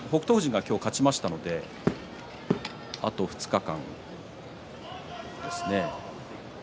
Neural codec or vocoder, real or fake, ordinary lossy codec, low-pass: none; real; none; none